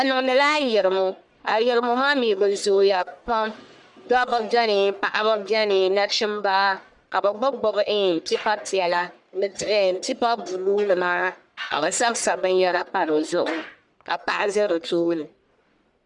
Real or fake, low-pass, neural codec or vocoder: fake; 10.8 kHz; codec, 44.1 kHz, 1.7 kbps, Pupu-Codec